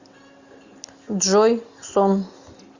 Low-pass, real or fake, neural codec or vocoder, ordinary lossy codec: 7.2 kHz; real; none; Opus, 64 kbps